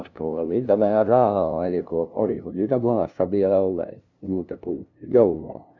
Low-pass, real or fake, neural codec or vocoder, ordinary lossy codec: 7.2 kHz; fake; codec, 16 kHz, 0.5 kbps, FunCodec, trained on LibriTTS, 25 frames a second; none